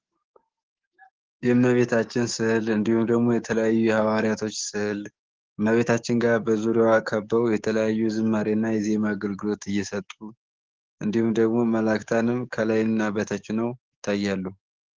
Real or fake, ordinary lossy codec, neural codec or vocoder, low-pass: fake; Opus, 16 kbps; codec, 44.1 kHz, 7.8 kbps, DAC; 7.2 kHz